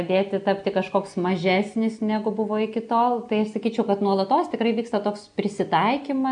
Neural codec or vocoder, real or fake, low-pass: none; real; 9.9 kHz